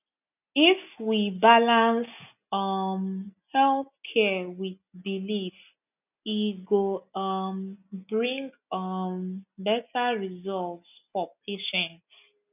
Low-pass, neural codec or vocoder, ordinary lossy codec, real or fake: 3.6 kHz; none; none; real